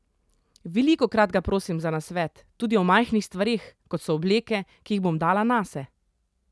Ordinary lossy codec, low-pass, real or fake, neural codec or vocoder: none; none; real; none